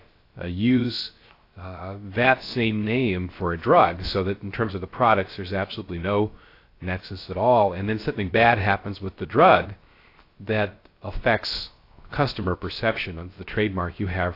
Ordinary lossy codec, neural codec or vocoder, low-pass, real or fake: AAC, 32 kbps; codec, 16 kHz, 0.3 kbps, FocalCodec; 5.4 kHz; fake